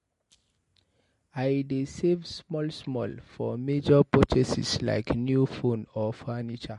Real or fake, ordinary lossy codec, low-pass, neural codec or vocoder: real; MP3, 48 kbps; 14.4 kHz; none